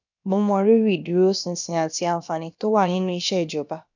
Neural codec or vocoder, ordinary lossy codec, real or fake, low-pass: codec, 16 kHz, about 1 kbps, DyCAST, with the encoder's durations; none; fake; 7.2 kHz